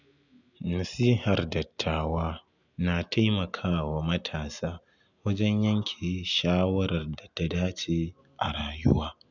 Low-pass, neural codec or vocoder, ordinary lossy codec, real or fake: 7.2 kHz; none; none; real